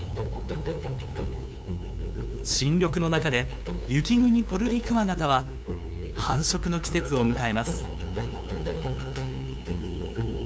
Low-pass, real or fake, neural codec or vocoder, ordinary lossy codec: none; fake; codec, 16 kHz, 2 kbps, FunCodec, trained on LibriTTS, 25 frames a second; none